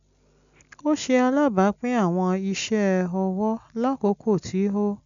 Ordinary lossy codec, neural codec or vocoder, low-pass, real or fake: none; none; 7.2 kHz; real